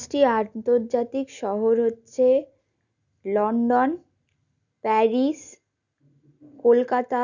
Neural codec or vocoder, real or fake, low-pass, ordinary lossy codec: none; real; 7.2 kHz; none